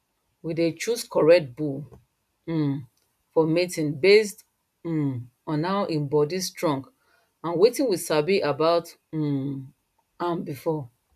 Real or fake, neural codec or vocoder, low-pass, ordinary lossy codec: real; none; 14.4 kHz; none